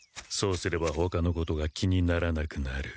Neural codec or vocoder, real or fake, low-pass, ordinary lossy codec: none; real; none; none